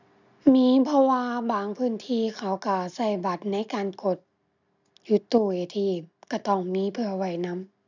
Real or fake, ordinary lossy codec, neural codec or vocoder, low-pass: real; none; none; 7.2 kHz